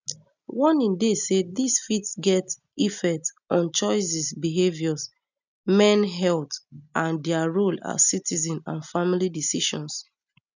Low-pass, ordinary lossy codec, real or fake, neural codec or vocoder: 7.2 kHz; none; real; none